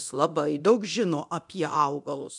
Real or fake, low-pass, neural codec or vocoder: fake; 10.8 kHz; codec, 24 kHz, 0.9 kbps, DualCodec